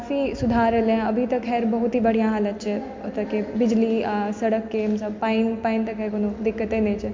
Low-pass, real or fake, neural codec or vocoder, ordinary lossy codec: 7.2 kHz; real; none; MP3, 48 kbps